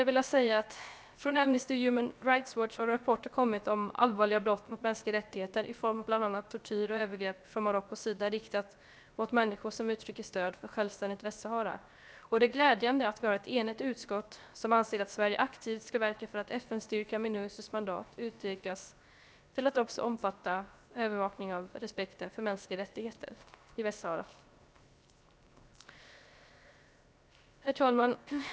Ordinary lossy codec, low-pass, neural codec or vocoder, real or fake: none; none; codec, 16 kHz, 0.7 kbps, FocalCodec; fake